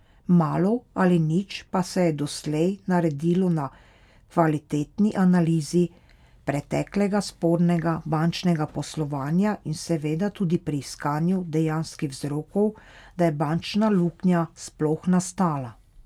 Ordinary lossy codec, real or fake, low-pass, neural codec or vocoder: none; real; 19.8 kHz; none